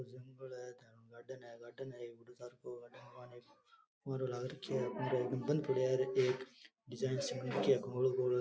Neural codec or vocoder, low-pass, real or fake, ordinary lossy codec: none; none; real; none